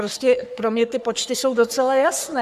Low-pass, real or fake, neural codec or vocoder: 14.4 kHz; fake; codec, 44.1 kHz, 3.4 kbps, Pupu-Codec